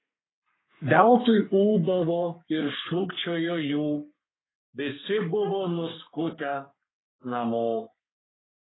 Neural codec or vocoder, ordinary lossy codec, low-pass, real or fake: codec, 32 kHz, 1.9 kbps, SNAC; AAC, 16 kbps; 7.2 kHz; fake